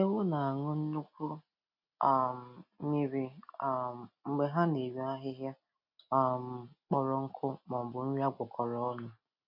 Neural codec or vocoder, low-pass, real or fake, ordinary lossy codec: none; 5.4 kHz; real; none